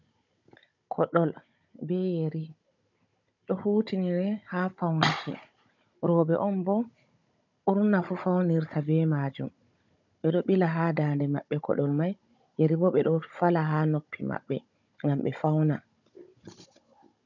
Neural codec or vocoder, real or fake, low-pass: codec, 16 kHz, 16 kbps, FunCodec, trained on Chinese and English, 50 frames a second; fake; 7.2 kHz